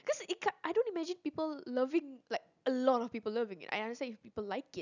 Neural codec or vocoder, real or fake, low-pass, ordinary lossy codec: none; real; 7.2 kHz; none